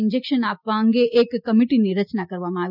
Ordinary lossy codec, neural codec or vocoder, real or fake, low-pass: none; none; real; 5.4 kHz